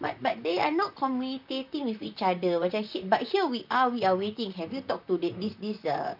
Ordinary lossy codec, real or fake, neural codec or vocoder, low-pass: none; fake; vocoder, 44.1 kHz, 128 mel bands, Pupu-Vocoder; 5.4 kHz